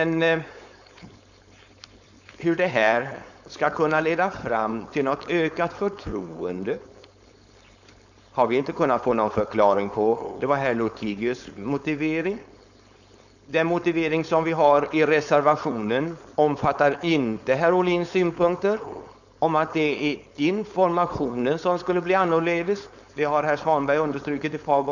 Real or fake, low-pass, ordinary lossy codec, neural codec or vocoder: fake; 7.2 kHz; none; codec, 16 kHz, 4.8 kbps, FACodec